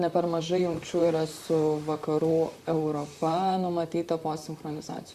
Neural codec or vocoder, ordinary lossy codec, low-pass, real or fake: vocoder, 44.1 kHz, 128 mel bands, Pupu-Vocoder; Opus, 64 kbps; 14.4 kHz; fake